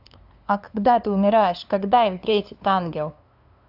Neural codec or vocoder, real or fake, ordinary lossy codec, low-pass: codec, 16 kHz, 2 kbps, FunCodec, trained on LibriTTS, 25 frames a second; fake; AAC, 48 kbps; 5.4 kHz